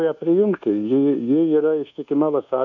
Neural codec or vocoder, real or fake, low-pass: codec, 24 kHz, 1.2 kbps, DualCodec; fake; 7.2 kHz